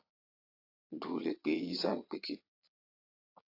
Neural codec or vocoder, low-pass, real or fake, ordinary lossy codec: vocoder, 22.05 kHz, 80 mel bands, Vocos; 5.4 kHz; fake; AAC, 32 kbps